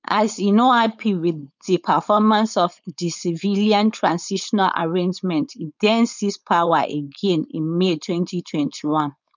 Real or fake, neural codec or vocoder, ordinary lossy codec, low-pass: fake; codec, 16 kHz, 4.8 kbps, FACodec; none; 7.2 kHz